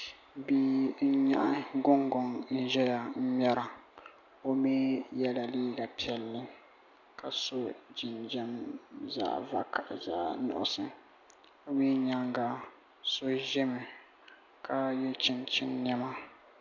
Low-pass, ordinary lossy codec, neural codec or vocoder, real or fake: 7.2 kHz; MP3, 64 kbps; none; real